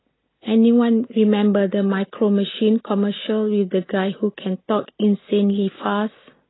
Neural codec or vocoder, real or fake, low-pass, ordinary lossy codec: none; real; 7.2 kHz; AAC, 16 kbps